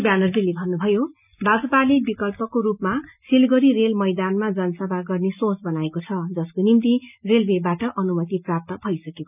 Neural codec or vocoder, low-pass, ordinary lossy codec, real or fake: none; 3.6 kHz; none; real